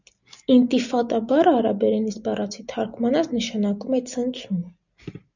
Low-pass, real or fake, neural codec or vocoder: 7.2 kHz; real; none